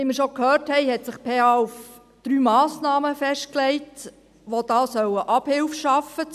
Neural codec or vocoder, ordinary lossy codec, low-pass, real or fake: none; none; 14.4 kHz; real